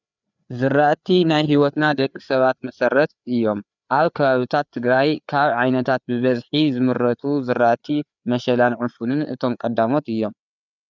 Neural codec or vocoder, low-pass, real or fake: codec, 16 kHz, 4 kbps, FreqCodec, larger model; 7.2 kHz; fake